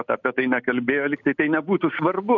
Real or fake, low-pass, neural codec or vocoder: real; 7.2 kHz; none